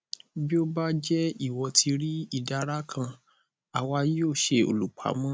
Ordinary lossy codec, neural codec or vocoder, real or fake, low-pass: none; none; real; none